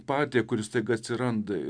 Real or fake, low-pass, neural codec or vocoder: real; 9.9 kHz; none